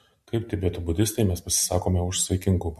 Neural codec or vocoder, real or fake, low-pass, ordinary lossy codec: none; real; 14.4 kHz; MP3, 64 kbps